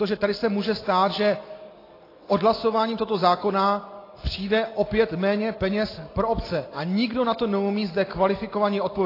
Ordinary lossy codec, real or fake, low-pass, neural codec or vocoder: AAC, 24 kbps; real; 5.4 kHz; none